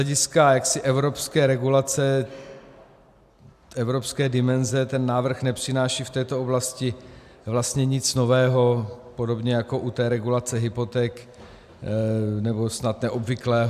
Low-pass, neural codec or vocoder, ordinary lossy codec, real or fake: 14.4 kHz; none; AAC, 96 kbps; real